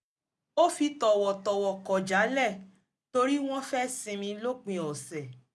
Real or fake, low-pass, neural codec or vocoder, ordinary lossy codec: real; none; none; none